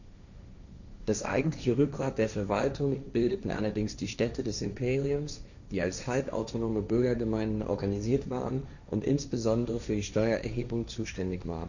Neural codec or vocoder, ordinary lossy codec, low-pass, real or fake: codec, 16 kHz, 1.1 kbps, Voila-Tokenizer; none; 7.2 kHz; fake